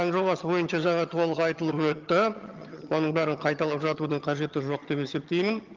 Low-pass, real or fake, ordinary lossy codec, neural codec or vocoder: 7.2 kHz; fake; Opus, 32 kbps; vocoder, 22.05 kHz, 80 mel bands, HiFi-GAN